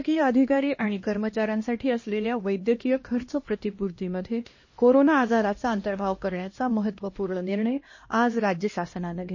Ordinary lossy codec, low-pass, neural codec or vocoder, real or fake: MP3, 32 kbps; 7.2 kHz; codec, 16 kHz, 1 kbps, X-Codec, HuBERT features, trained on LibriSpeech; fake